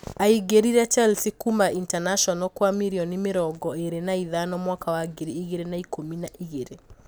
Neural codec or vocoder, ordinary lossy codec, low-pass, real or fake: none; none; none; real